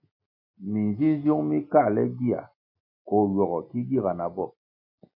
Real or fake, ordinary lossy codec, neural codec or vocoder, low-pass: real; MP3, 32 kbps; none; 5.4 kHz